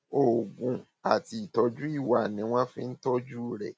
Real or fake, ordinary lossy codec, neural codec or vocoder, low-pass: real; none; none; none